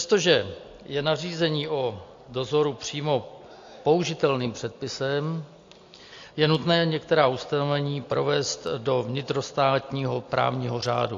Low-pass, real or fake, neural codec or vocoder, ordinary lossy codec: 7.2 kHz; real; none; AAC, 48 kbps